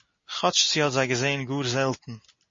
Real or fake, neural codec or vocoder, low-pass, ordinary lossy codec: real; none; 7.2 kHz; MP3, 32 kbps